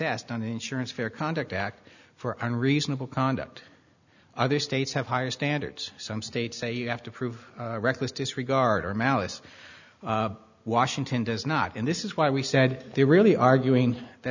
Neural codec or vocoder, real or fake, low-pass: none; real; 7.2 kHz